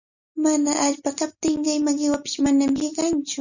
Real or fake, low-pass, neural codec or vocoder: real; 7.2 kHz; none